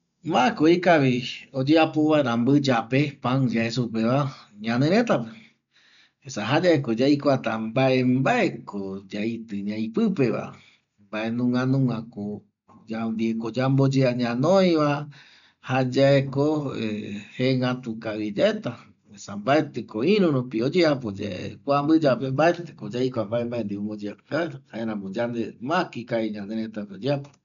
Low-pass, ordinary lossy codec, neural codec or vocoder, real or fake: 7.2 kHz; none; none; real